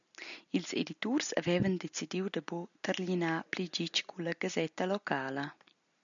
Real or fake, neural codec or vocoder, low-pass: real; none; 7.2 kHz